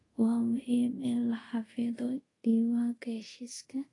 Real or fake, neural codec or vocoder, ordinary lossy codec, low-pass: fake; codec, 24 kHz, 0.5 kbps, DualCodec; AAC, 32 kbps; 10.8 kHz